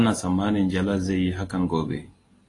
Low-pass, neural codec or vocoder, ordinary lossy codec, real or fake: 10.8 kHz; none; AAC, 32 kbps; real